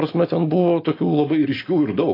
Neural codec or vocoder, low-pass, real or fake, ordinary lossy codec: none; 5.4 kHz; real; AAC, 24 kbps